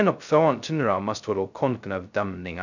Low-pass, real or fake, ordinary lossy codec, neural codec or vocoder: 7.2 kHz; fake; none; codec, 16 kHz, 0.2 kbps, FocalCodec